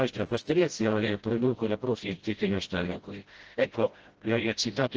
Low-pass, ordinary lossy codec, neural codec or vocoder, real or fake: 7.2 kHz; Opus, 16 kbps; codec, 16 kHz, 0.5 kbps, FreqCodec, smaller model; fake